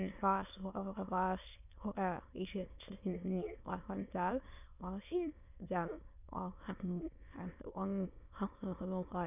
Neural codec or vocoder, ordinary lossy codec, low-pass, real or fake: autoencoder, 22.05 kHz, a latent of 192 numbers a frame, VITS, trained on many speakers; none; 3.6 kHz; fake